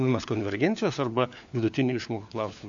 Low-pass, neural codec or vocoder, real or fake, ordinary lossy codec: 7.2 kHz; codec, 16 kHz, 4 kbps, FunCodec, trained on LibriTTS, 50 frames a second; fake; AAC, 64 kbps